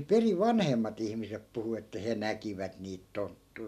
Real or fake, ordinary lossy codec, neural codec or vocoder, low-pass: real; none; none; 14.4 kHz